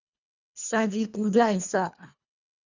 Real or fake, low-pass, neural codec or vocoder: fake; 7.2 kHz; codec, 24 kHz, 1.5 kbps, HILCodec